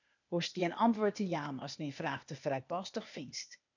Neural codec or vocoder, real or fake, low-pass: codec, 16 kHz, 0.8 kbps, ZipCodec; fake; 7.2 kHz